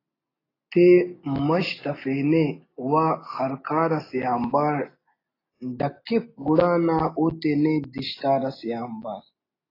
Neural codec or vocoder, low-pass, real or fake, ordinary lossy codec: none; 5.4 kHz; real; AAC, 24 kbps